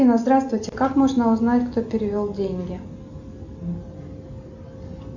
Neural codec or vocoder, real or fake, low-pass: none; real; 7.2 kHz